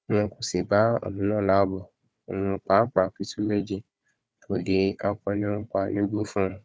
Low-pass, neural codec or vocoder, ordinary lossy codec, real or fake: none; codec, 16 kHz, 4 kbps, FunCodec, trained on Chinese and English, 50 frames a second; none; fake